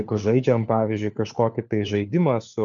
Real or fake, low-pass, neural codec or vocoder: fake; 7.2 kHz; codec, 16 kHz, 4 kbps, FunCodec, trained on LibriTTS, 50 frames a second